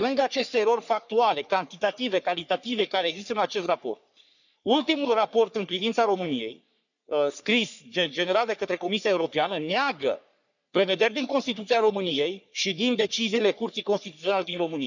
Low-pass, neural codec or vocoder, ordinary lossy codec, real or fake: 7.2 kHz; codec, 44.1 kHz, 3.4 kbps, Pupu-Codec; none; fake